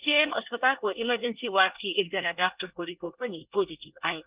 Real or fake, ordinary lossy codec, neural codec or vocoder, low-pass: fake; Opus, 24 kbps; codec, 24 kHz, 1 kbps, SNAC; 3.6 kHz